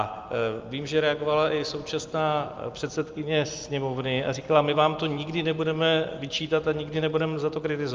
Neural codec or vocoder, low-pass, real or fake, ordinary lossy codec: none; 7.2 kHz; real; Opus, 24 kbps